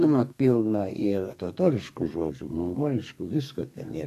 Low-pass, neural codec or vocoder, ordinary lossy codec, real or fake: 14.4 kHz; codec, 32 kHz, 1.9 kbps, SNAC; AAC, 64 kbps; fake